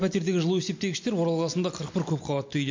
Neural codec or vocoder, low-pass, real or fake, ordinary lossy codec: none; 7.2 kHz; real; MP3, 48 kbps